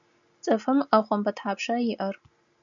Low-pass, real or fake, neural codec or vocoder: 7.2 kHz; real; none